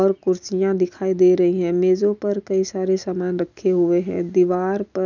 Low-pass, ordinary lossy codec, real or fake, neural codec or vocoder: 7.2 kHz; none; real; none